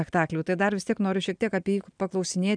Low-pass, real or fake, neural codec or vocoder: 9.9 kHz; real; none